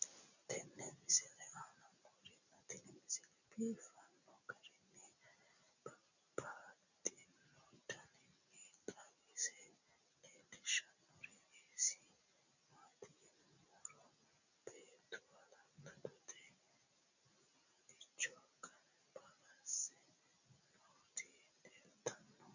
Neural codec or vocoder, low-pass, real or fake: none; 7.2 kHz; real